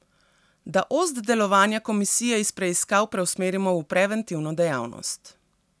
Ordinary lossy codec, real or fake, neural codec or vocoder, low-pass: none; real; none; none